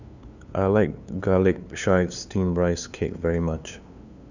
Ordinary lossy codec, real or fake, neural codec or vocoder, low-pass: none; fake; codec, 16 kHz, 2 kbps, FunCodec, trained on LibriTTS, 25 frames a second; 7.2 kHz